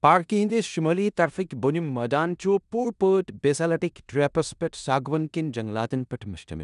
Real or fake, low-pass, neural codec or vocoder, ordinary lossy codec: fake; 10.8 kHz; codec, 16 kHz in and 24 kHz out, 0.9 kbps, LongCat-Audio-Codec, four codebook decoder; none